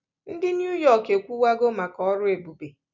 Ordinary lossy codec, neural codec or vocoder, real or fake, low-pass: none; none; real; 7.2 kHz